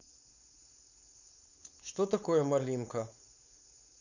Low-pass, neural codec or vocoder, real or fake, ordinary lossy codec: 7.2 kHz; codec, 16 kHz, 4.8 kbps, FACodec; fake; none